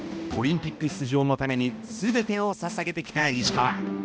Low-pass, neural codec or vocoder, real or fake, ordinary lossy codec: none; codec, 16 kHz, 1 kbps, X-Codec, HuBERT features, trained on balanced general audio; fake; none